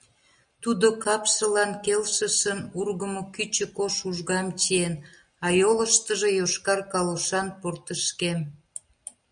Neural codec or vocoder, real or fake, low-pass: none; real; 9.9 kHz